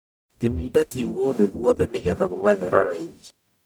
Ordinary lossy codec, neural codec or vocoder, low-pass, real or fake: none; codec, 44.1 kHz, 0.9 kbps, DAC; none; fake